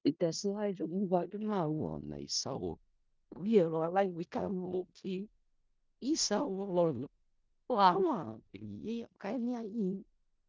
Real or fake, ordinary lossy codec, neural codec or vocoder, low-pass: fake; Opus, 32 kbps; codec, 16 kHz in and 24 kHz out, 0.4 kbps, LongCat-Audio-Codec, four codebook decoder; 7.2 kHz